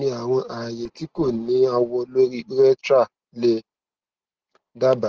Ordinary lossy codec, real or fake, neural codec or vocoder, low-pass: Opus, 16 kbps; real; none; 7.2 kHz